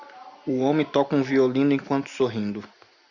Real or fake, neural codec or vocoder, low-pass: real; none; 7.2 kHz